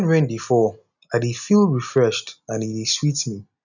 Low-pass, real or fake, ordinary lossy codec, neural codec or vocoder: 7.2 kHz; real; none; none